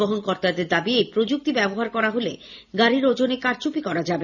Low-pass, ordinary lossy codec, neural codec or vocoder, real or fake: 7.2 kHz; none; none; real